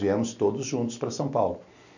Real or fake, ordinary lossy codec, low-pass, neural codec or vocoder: real; none; 7.2 kHz; none